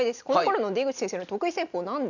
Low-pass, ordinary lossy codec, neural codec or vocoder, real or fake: 7.2 kHz; none; none; real